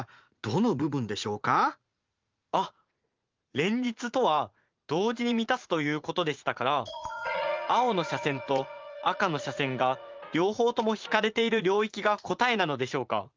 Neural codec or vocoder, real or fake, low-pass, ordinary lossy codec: vocoder, 44.1 kHz, 80 mel bands, Vocos; fake; 7.2 kHz; Opus, 24 kbps